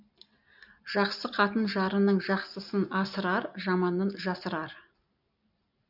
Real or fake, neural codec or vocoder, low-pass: real; none; 5.4 kHz